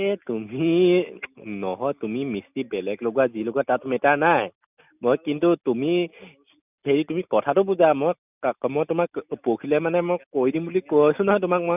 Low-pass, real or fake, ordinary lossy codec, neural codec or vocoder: 3.6 kHz; real; none; none